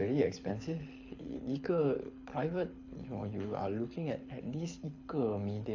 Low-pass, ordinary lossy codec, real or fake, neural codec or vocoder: 7.2 kHz; AAC, 48 kbps; fake; codec, 24 kHz, 6 kbps, HILCodec